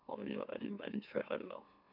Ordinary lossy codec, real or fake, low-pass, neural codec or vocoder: none; fake; 5.4 kHz; autoencoder, 44.1 kHz, a latent of 192 numbers a frame, MeloTTS